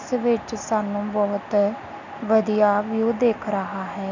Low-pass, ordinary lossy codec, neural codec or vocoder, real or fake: 7.2 kHz; none; none; real